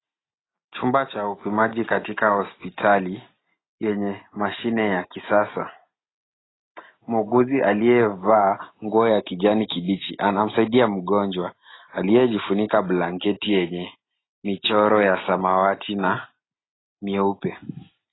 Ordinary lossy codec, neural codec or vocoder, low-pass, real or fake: AAC, 16 kbps; none; 7.2 kHz; real